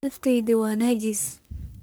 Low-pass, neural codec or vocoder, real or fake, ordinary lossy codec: none; codec, 44.1 kHz, 1.7 kbps, Pupu-Codec; fake; none